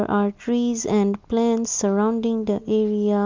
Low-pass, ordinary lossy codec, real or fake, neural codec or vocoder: 7.2 kHz; Opus, 32 kbps; real; none